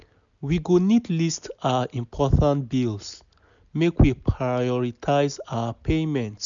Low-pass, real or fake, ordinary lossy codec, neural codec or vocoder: 7.2 kHz; real; none; none